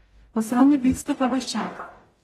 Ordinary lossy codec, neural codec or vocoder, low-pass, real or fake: AAC, 32 kbps; codec, 44.1 kHz, 0.9 kbps, DAC; 19.8 kHz; fake